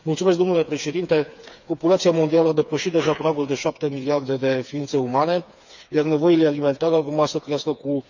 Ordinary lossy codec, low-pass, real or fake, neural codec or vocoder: none; 7.2 kHz; fake; codec, 16 kHz, 4 kbps, FreqCodec, smaller model